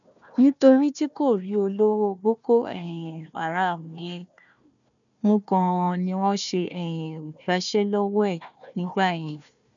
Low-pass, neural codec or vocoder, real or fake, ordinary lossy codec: 7.2 kHz; codec, 16 kHz, 1 kbps, FunCodec, trained on Chinese and English, 50 frames a second; fake; none